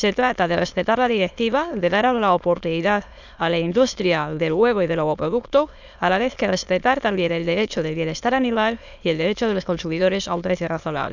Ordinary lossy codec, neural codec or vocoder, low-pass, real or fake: none; autoencoder, 22.05 kHz, a latent of 192 numbers a frame, VITS, trained on many speakers; 7.2 kHz; fake